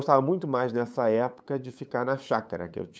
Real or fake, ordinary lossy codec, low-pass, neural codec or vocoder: fake; none; none; codec, 16 kHz, 8 kbps, FunCodec, trained on LibriTTS, 25 frames a second